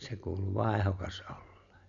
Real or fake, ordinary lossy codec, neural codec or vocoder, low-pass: real; none; none; 7.2 kHz